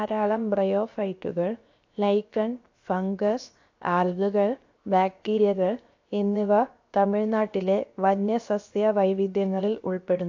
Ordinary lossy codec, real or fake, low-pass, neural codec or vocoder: AAC, 48 kbps; fake; 7.2 kHz; codec, 16 kHz, 0.7 kbps, FocalCodec